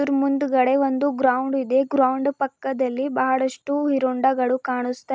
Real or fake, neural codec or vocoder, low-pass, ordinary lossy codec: real; none; none; none